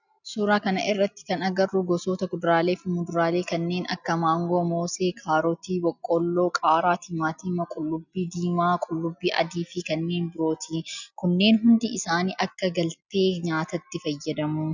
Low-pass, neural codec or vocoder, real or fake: 7.2 kHz; none; real